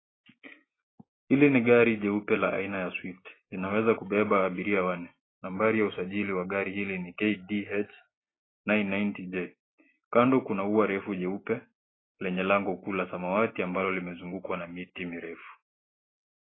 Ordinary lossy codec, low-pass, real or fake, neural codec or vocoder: AAC, 16 kbps; 7.2 kHz; real; none